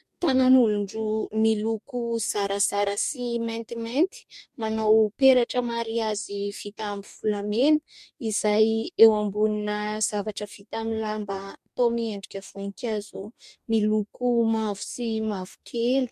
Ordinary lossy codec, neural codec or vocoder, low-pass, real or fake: MP3, 64 kbps; codec, 44.1 kHz, 2.6 kbps, DAC; 14.4 kHz; fake